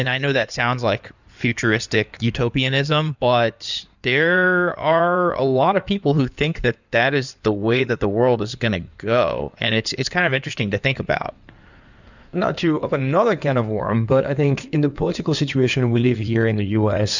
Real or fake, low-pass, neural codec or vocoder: fake; 7.2 kHz; codec, 16 kHz in and 24 kHz out, 2.2 kbps, FireRedTTS-2 codec